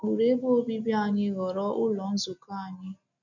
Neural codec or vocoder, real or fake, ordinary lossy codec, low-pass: none; real; none; 7.2 kHz